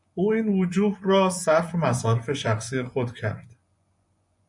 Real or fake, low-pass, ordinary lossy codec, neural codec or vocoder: fake; 10.8 kHz; MP3, 96 kbps; vocoder, 24 kHz, 100 mel bands, Vocos